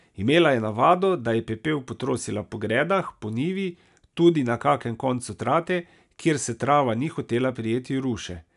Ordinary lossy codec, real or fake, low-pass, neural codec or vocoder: none; real; 10.8 kHz; none